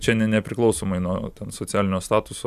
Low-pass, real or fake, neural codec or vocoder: 14.4 kHz; real; none